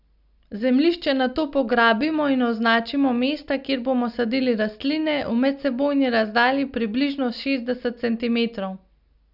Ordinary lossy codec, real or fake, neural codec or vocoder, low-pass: none; real; none; 5.4 kHz